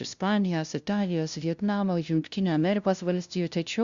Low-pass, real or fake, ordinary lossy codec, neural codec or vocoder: 7.2 kHz; fake; Opus, 64 kbps; codec, 16 kHz, 0.5 kbps, FunCodec, trained on LibriTTS, 25 frames a second